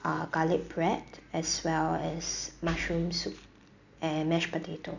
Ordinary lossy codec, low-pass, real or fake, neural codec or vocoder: none; 7.2 kHz; real; none